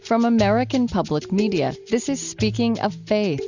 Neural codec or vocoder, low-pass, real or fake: none; 7.2 kHz; real